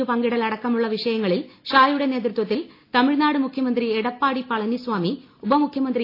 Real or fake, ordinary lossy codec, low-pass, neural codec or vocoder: real; AAC, 32 kbps; 5.4 kHz; none